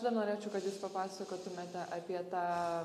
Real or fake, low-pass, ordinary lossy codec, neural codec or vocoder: real; 14.4 kHz; MP3, 64 kbps; none